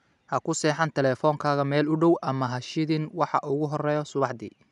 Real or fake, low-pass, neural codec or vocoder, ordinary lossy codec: real; 10.8 kHz; none; none